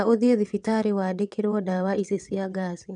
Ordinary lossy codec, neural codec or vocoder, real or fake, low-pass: none; vocoder, 22.05 kHz, 80 mel bands, WaveNeXt; fake; 9.9 kHz